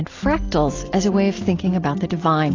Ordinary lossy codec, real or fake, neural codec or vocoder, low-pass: AAC, 32 kbps; real; none; 7.2 kHz